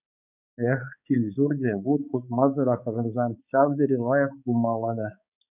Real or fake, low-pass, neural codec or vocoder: fake; 3.6 kHz; codec, 16 kHz, 4 kbps, X-Codec, HuBERT features, trained on balanced general audio